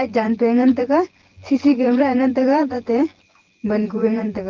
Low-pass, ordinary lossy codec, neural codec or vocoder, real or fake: 7.2 kHz; Opus, 16 kbps; vocoder, 24 kHz, 100 mel bands, Vocos; fake